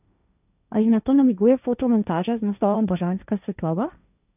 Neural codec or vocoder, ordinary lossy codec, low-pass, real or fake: codec, 16 kHz, 1.1 kbps, Voila-Tokenizer; none; 3.6 kHz; fake